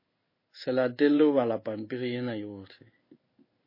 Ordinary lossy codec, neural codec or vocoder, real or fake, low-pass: MP3, 24 kbps; codec, 16 kHz in and 24 kHz out, 1 kbps, XY-Tokenizer; fake; 5.4 kHz